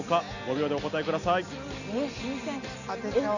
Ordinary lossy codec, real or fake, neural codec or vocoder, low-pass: none; real; none; 7.2 kHz